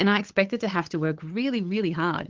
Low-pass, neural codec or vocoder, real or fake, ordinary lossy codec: 7.2 kHz; codec, 16 kHz, 8 kbps, FunCodec, trained on LibriTTS, 25 frames a second; fake; Opus, 16 kbps